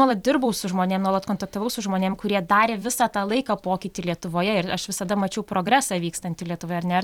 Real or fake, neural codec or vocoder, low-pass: fake; vocoder, 44.1 kHz, 128 mel bands every 256 samples, BigVGAN v2; 19.8 kHz